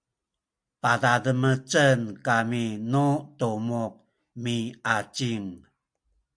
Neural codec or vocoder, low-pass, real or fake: none; 9.9 kHz; real